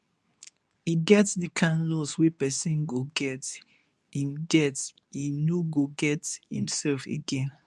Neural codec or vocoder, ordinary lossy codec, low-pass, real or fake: codec, 24 kHz, 0.9 kbps, WavTokenizer, medium speech release version 2; none; none; fake